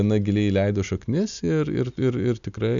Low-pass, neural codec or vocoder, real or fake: 7.2 kHz; none; real